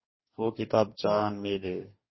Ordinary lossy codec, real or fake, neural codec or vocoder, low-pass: MP3, 24 kbps; fake; codec, 44.1 kHz, 2.6 kbps, DAC; 7.2 kHz